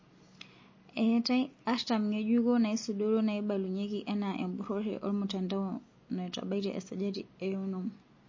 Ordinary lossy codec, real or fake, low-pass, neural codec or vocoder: MP3, 32 kbps; real; 7.2 kHz; none